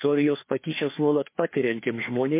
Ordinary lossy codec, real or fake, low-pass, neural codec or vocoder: MP3, 24 kbps; fake; 3.6 kHz; codec, 16 kHz, 2 kbps, FreqCodec, larger model